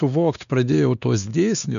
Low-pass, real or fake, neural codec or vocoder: 7.2 kHz; fake; codec, 16 kHz, 2 kbps, X-Codec, WavLM features, trained on Multilingual LibriSpeech